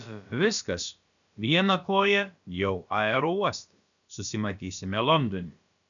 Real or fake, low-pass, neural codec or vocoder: fake; 7.2 kHz; codec, 16 kHz, about 1 kbps, DyCAST, with the encoder's durations